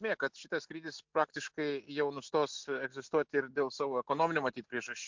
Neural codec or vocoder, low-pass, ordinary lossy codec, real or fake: none; 7.2 kHz; MP3, 64 kbps; real